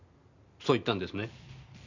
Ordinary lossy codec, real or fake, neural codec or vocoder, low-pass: none; real; none; 7.2 kHz